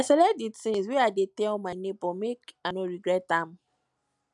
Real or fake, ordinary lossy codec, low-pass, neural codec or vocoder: real; none; 10.8 kHz; none